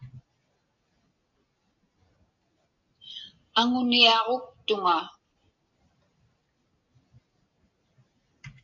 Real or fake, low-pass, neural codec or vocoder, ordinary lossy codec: real; 7.2 kHz; none; AAC, 48 kbps